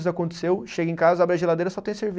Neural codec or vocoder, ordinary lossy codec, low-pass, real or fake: none; none; none; real